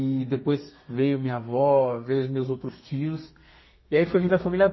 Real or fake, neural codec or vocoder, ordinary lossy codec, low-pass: fake; codec, 32 kHz, 1.9 kbps, SNAC; MP3, 24 kbps; 7.2 kHz